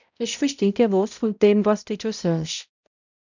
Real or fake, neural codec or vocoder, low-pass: fake; codec, 16 kHz, 0.5 kbps, X-Codec, HuBERT features, trained on balanced general audio; 7.2 kHz